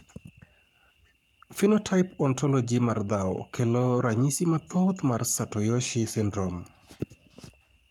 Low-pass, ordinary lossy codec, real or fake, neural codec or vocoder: 19.8 kHz; none; fake; codec, 44.1 kHz, 7.8 kbps, Pupu-Codec